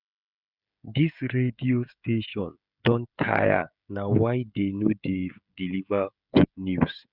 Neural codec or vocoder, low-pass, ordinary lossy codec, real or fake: codec, 16 kHz, 16 kbps, FreqCodec, smaller model; 5.4 kHz; none; fake